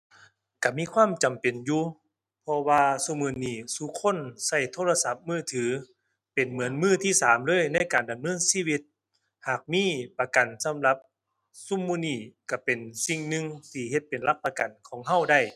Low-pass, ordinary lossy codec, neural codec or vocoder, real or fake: 14.4 kHz; none; none; real